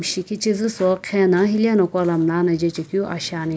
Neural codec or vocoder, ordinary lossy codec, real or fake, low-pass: none; none; real; none